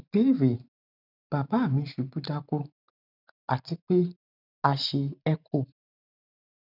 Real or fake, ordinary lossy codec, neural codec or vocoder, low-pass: fake; none; vocoder, 44.1 kHz, 128 mel bands every 512 samples, BigVGAN v2; 5.4 kHz